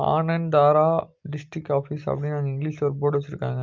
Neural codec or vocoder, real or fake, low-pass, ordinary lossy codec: none; real; 7.2 kHz; Opus, 24 kbps